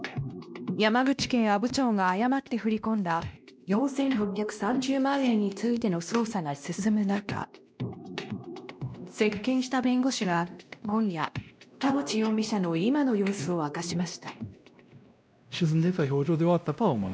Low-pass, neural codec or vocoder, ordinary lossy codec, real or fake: none; codec, 16 kHz, 1 kbps, X-Codec, WavLM features, trained on Multilingual LibriSpeech; none; fake